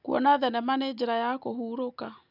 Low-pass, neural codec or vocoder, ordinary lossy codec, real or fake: 5.4 kHz; none; none; real